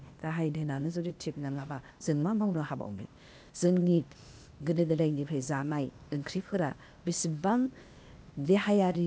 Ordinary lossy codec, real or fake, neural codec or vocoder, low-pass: none; fake; codec, 16 kHz, 0.8 kbps, ZipCodec; none